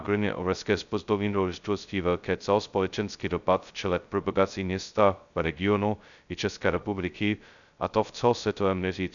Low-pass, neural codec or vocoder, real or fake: 7.2 kHz; codec, 16 kHz, 0.2 kbps, FocalCodec; fake